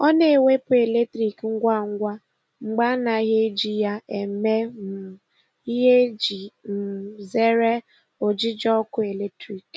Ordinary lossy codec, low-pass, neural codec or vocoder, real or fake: none; none; none; real